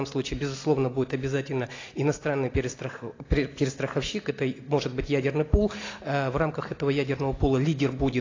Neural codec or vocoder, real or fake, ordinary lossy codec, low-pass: none; real; AAC, 32 kbps; 7.2 kHz